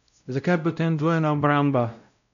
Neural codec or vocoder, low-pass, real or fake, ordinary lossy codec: codec, 16 kHz, 0.5 kbps, X-Codec, WavLM features, trained on Multilingual LibriSpeech; 7.2 kHz; fake; none